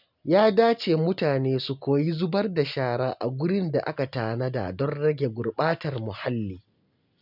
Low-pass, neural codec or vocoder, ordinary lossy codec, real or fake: 5.4 kHz; none; none; real